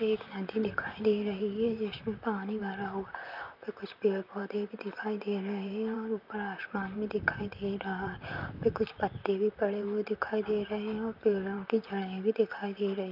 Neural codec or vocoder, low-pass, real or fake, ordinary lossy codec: vocoder, 44.1 kHz, 128 mel bands, Pupu-Vocoder; 5.4 kHz; fake; none